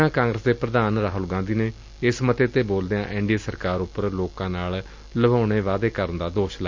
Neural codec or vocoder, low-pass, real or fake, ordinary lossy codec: none; 7.2 kHz; real; MP3, 64 kbps